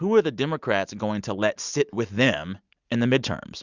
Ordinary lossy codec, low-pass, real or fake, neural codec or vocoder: Opus, 64 kbps; 7.2 kHz; real; none